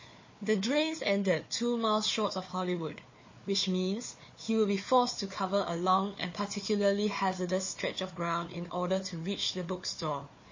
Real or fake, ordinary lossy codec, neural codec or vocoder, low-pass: fake; MP3, 32 kbps; codec, 16 kHz, 4 kbps, FunCodec, trained on Chinese and English, 50 frames a second; 7.2 kHz